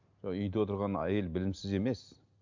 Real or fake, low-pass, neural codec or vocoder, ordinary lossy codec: real; 7.2 kHz; none; MP3, 64 kbps